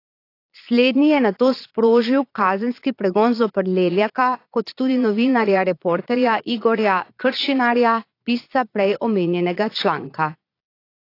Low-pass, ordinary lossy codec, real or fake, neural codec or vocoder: 5.4 kHz; AAC, 32 kbps; fake; vocoder, 22.05 kHz, 80 mel bands, Vocos